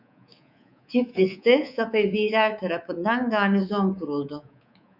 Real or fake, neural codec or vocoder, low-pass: fake; codec, 24 kHz, 3.1 kbps, DualCodec; 5.4 kHz